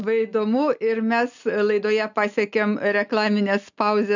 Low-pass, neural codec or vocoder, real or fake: 7.2 kHz; none; real